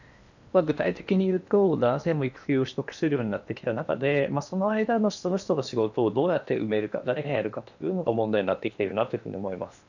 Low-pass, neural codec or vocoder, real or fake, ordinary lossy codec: 7.2 kHz; codec, 16 kHz in and 24 kHz out, 0.8 kbps, FocalCodec, streaming, 65536 codes; fake; none